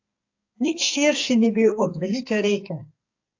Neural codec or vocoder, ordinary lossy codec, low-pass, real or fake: codec, 44.1 kHz, 2.6 kbps, SNAC; AAC, 48 kbps; 7.2 kHz; fake